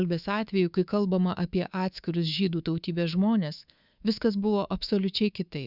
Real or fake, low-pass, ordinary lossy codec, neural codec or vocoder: fake; 5.4 kHz; Opus, 64 kbps; codec, 24 kHz, 3.1 kbps, DualCodec